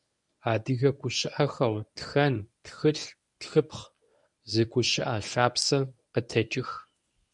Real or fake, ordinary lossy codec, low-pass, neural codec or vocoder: fake; MP3, 96 kbps; 10.8 kHz; codec, 24 kHz, 0.9 kbps, WavTokenizer, medium speech release version 1